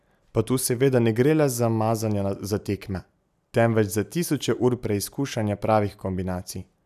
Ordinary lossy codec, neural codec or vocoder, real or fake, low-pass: none; none; real; 14.4 kHz